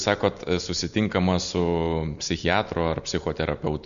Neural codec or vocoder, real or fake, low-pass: none; real; 7.2 kHz